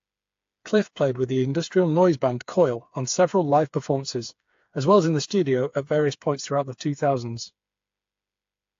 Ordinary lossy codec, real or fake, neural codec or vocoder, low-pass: AAC, 48 kbps; fake; codec, 16 kHz, 4 kbps, FreqCodec, smaller model; 7.2 kHz